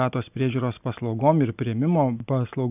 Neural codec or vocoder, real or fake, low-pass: none; real; 3.6 kHz